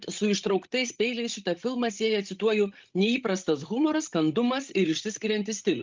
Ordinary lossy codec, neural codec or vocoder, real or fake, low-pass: Opus, 32 kbps; codec, 16 kHz, 16 kbps, FreqCodec, larger model; fake; 7.2 kHz